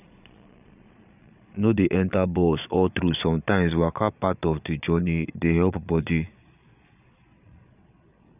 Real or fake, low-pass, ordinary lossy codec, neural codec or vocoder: fake; 3.6 kHz; none; vocoder, 22.05 kHz, 80 mel bands, Vocos